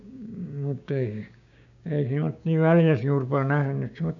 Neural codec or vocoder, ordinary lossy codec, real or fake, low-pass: codec, 16 kHz, 6 kbps, DAC; none; fake; 7.2 kHz